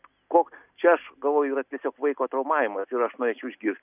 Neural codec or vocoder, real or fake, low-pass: none; real; 3.6 kHz